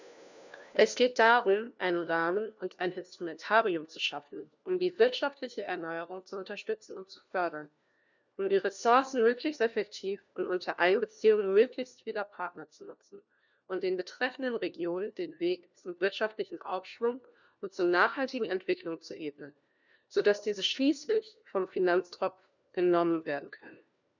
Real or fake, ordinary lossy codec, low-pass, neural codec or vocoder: fake; none; 7.2 kHz; codec, 16 kHz, 1 kbps, FunCodec, trained on LibriTTS, 50 frames a second